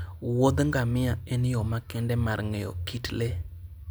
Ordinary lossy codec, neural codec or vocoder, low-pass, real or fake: none; none; none; real